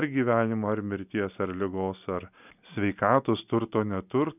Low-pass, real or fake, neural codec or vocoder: 3.6 kHz; real; none